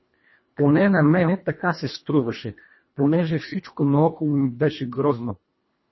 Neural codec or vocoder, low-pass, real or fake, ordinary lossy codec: codec, 24 kHz, 1.5 kbps, HILCodec; 7.2 kHz; fake; MP3, 24 kbps